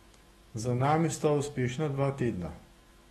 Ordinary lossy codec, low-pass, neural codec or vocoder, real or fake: AAC, 32 kbps; 19.8 kHz; autoencoder, 48 kHz, 128 numbers a frame, DAC-VAE, trained on Japanese speech; fake